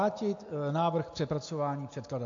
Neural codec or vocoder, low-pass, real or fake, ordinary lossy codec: none; 7.2 kHz; real; MP3, 48 kbps